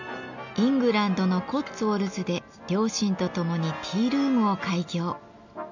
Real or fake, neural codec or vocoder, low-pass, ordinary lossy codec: real; none; 7.2 kHz; none